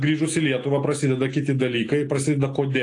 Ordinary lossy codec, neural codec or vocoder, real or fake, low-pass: AAC, 48 kbps; none; real; 10.8 kHz